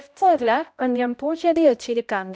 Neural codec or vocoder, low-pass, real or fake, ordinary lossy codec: codec, 16 kHz, 0.5 kbps, X-Codec, HuBERT features, trained on balanced general audio; none; fake; none